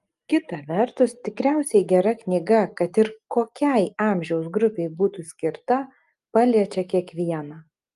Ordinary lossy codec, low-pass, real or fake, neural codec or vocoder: Opus, 32 kbps; 10.8 kHz; real; none